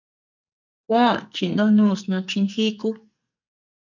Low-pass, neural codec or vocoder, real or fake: 7.2 kHz; codec, 44.1 kHz, 2.6 kbps, SNAC; fake